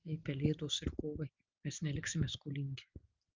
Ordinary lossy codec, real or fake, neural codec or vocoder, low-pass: Opus, 24 kbps; real; none; 7.2 kHz